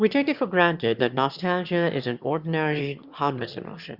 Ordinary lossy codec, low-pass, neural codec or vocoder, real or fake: Opus, 64 kbps; 5.4 kHz; autoencoder, 22.05 kHz, a latent of 192 numbers a frame, VITS, trained on one speaker; fake